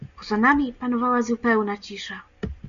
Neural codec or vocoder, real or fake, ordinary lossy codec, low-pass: none; real; AAC, 96 kbps; 7.2 kHz